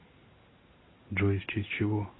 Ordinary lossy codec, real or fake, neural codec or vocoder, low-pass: AAC, 16 kbps; real; none; 7.2 kHz